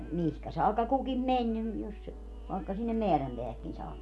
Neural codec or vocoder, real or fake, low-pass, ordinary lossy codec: none; real; none; none